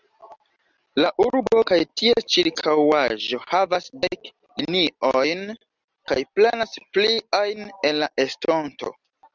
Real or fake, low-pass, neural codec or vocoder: real; 7.2 kHz; none